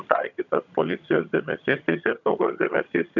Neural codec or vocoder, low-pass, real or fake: vocoder, 22.05 kHz, 80 mel bands, HiFi-GAN; 7.2 kHz; fake